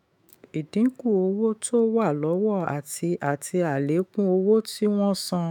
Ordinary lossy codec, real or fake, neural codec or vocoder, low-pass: none; fake; autoencoder, 48 kHz, 128 numbers a frame, DAC-VAE, trained on Japanese speech; none